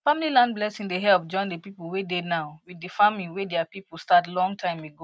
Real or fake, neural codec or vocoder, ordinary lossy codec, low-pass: real; none; none; none